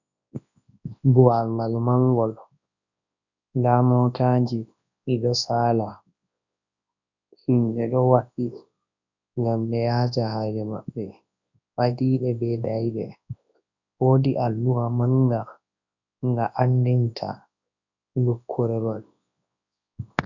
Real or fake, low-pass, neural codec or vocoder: fake; 7.2 kHz; codec, 24 kHz, 0.9 kbps, WavTokenizer, large speech release